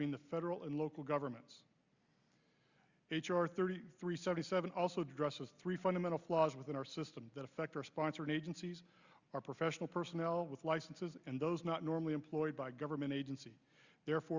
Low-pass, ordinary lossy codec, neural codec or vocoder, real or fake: 7.2 kHz; Opus, 64 kbps; none; real